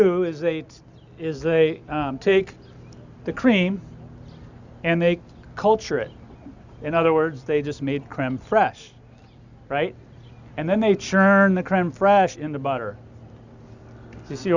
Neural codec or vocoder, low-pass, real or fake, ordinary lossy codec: none; 7.2 kHz; real; Opus, 64 kbps